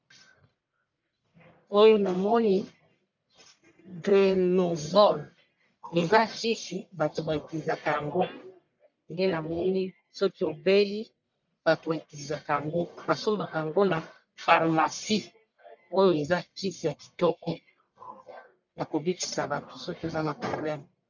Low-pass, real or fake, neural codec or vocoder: 7.2 kHz; fake; codec, 44.1 kHz, 1.7 kbps, Pupu-Codec